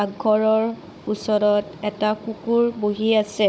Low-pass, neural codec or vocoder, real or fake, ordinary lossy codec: none; codec, 16 kHz, 16 kbps, FunCodec, trained on Chinese and English, 50 frames a second; fake; none